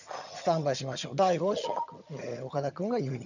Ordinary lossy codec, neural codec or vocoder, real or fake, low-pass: none; vocoder, 22.05 kHz, 80 mel bands, HiFi-GAN; fake; 7.2 kHz